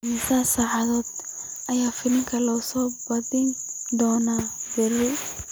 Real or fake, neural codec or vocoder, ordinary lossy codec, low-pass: real; none; none; none